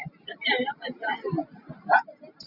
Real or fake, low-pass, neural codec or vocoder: real; 5.4 kHz; none